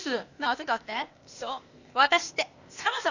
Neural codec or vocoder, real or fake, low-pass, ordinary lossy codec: codec, 16 kHz, 0.8 kbps, ZipCodec; fake; 7.2 kHz; none